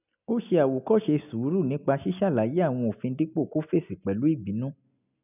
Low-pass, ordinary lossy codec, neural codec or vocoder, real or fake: 3.6 kHz; none; none; real